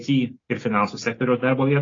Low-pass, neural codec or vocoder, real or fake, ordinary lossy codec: 7.2 kHz; none; real; AAC, 32 kbps